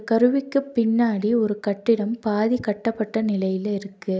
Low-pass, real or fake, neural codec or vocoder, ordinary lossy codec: none; real; none; none